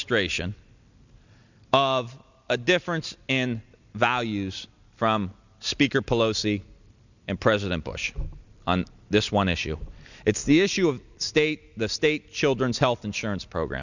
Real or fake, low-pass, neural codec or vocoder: real; 7.2 kHz; none